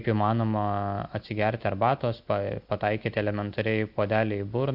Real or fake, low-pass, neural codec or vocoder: real; 5.4 kHz; none